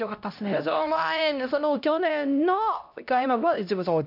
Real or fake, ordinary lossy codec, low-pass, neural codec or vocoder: fake; none; 5.4 kHz; codec, 16 kHz, 1 kbps, X-Codec, HuBERT features, trained on LibriSpeech